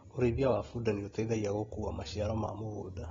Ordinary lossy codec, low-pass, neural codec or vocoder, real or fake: AAC, 24 kbps; 7.2 kHz; none; real